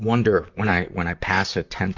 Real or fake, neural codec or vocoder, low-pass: fake; vocoder, 44.1 kHz, 128 mel bands, Pupu-Vocoder; 7.2 kHz